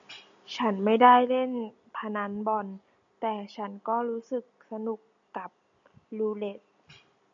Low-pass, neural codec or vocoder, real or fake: 7.2 kHz; none; real